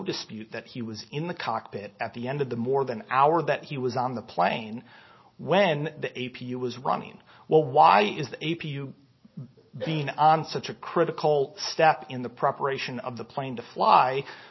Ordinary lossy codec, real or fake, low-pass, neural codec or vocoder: MP3, 24 kbps; real; 7.2 kHz; none